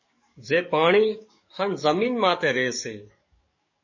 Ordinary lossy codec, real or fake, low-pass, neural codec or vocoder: MP3, 32 kbps; fake; 7.2 kHz; codec, 44.1 kHz, 7.8 kbps, DAC